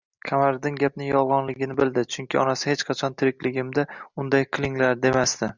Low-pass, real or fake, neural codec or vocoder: 7.2 kHz; real; none